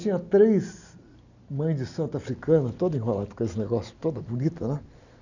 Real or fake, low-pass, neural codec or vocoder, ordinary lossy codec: real; 7.2 kHz; none; none